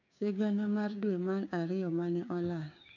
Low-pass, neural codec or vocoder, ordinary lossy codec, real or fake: 7.2 kHz; codec, 16 kHz, 6 kbps, DAC; none; fake